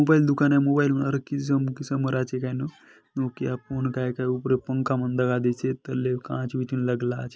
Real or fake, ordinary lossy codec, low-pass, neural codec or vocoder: real; none; none; none